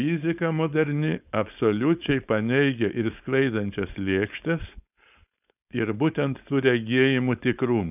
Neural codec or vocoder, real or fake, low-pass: codec, 16 kHz, 4.8 kbps, FACodec; fake; 3.6 kHz